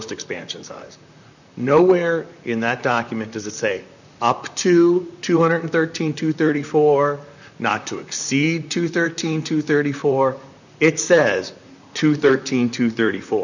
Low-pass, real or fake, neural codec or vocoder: 7.2 kHz; fake; vocoder, 44.1 kHz, 128 mel bands, Pupu-Vocoder